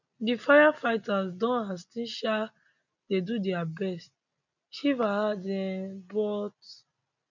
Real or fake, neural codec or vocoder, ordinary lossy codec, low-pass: real; none; none; 7.2 kHz